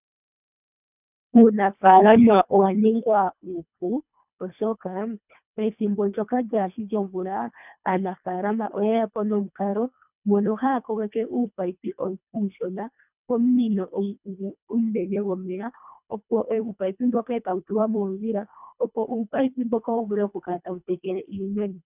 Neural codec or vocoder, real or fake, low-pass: codec, 24 kHz, 1.5 kbps, HILCodec; fake; 3.6 kHz